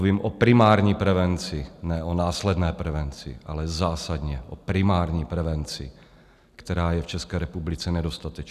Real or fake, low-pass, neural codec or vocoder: real; 14.4 kHz; none